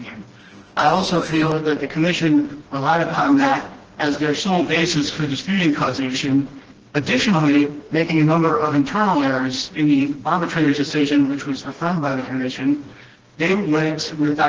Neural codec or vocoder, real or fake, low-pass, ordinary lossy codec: codec, 16 kHz, 1 kbps, FreqCodec, smaller model; fake; 7.2 kHz; Opus, 16 kbps